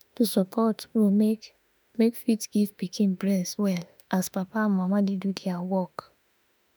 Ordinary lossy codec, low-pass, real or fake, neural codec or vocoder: none; none; fake; autoencoder, 48 kHz, 32 numbers a frame, DAC-VAE, trained on Japanese speech